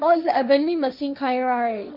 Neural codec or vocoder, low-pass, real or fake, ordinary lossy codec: codec, 16 kHz, 1.1 kbps, Voila-Tokenizer; 5.4 kHz; fake; none